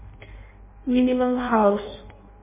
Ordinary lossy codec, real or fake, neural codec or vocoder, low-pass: MP3, 16 kbps; fake; codec, 16 kHz in and 24 kHz out, 0.6 kbps, FireRedTTS-2 codec; 3.6 kHz